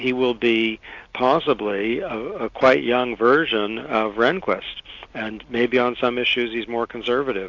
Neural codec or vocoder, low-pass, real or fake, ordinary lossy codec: none; 7.2 kHz; real; AAC, 48 kbps